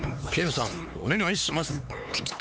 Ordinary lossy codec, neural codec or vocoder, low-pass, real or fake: none; codec, 16 kHz, 4 kbps, X-Codec, HuBERT features, trained on LibriSpeech; none; fake